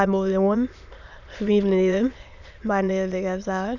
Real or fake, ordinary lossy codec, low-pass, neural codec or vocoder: fake; none; 7.2 kHz; autoencoder, 22.05 kHz, a latent of 192 numbers a frame, VITS, trained on many speakers